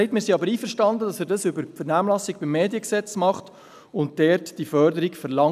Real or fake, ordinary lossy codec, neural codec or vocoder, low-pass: real; none; none; 14.4 kHz